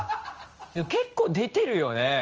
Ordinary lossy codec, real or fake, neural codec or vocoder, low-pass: Opus, 24 kbps; fake; codec, 16 kHz in and 24 kHz out, 1 kbps, XY-Tokenizer; 7.2 kHz